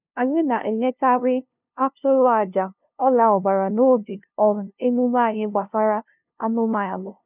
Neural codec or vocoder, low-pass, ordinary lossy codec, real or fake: codec, 16 kHz, 0.5 kbps, FunCodec, trained on LibriTTS, 25 frames a second; 3.6 kHz; none; fake